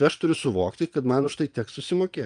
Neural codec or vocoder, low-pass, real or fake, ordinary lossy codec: vocoder, 22.05 kHz, 80 mel bands, Vocos; 9.9 kHz; fake; Opus, 32 kbps